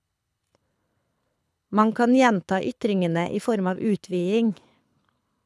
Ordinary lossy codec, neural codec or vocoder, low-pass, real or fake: none; codec, 24 kHz, 6 kbps, HILCodec; none; fake